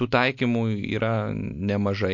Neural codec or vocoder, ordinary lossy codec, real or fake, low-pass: none; MP3, 48 kbps; real; 7.2 kHz